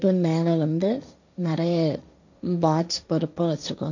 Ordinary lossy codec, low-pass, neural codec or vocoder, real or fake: none; 7.2 kHz; codec, 16 kHz, 1.1 kbps, Voila-Tokenizer; fake